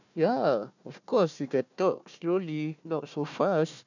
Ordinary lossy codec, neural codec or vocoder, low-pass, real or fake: none; codec, 16 kHz, 1 kbps, FunCodec, trained on Chinese and English, 50 frames a second; 7.2 kHz; fake